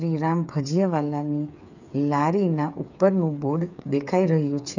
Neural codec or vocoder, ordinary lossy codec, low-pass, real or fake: codec, 16 kHz, 8 kbps, FreqCodec, smaller model; none; 7.2 kHz; fake